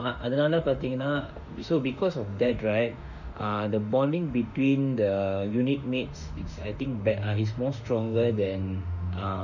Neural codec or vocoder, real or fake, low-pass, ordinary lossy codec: autoencoder, 48 kHz, 32 numbers a frame, DAC-VAE, trained on Japanese speech; fake; 7.2 kHz; none